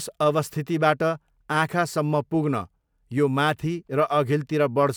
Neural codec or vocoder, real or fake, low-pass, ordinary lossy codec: none; real; none; none